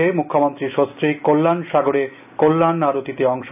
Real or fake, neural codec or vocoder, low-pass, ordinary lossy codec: real; none; 3.6 kHz; none